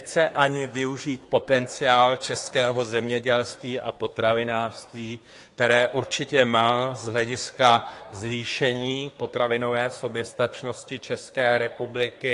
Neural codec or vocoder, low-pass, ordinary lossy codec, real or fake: codec, 24 kHz, 1 kbps, SNAC; 10.8 kHz; AAC, 48 kbps; fake